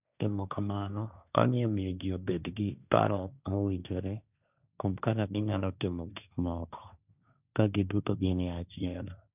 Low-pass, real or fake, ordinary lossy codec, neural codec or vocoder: 3.6 kHz; fake; none; codec, 16 kHz, 1.1 kbps, Voila-Tokenizer